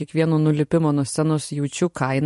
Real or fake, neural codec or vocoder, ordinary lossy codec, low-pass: fake; vocoder, 44.1 kHz, 128 mel bands every 256 samples, BigVGAN v2; MP3, 48 kbps; 14.4 kHz